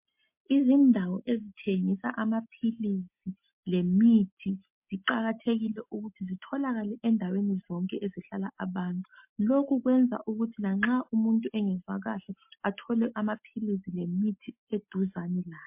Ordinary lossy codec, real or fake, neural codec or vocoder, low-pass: MP3, 32 kbps; real; none; 3.6 kHz